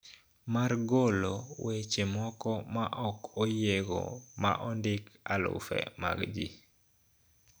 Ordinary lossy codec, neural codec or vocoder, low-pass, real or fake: none; none; none; real